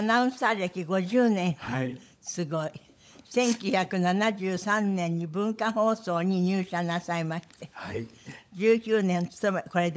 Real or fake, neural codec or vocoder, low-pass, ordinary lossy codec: fake; codec, 16 kHz, 16 kbps, FunCodec, trained on LibriTTS, 50 frames a second; none; none